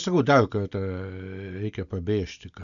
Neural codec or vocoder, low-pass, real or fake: none; 7.2 kHz; real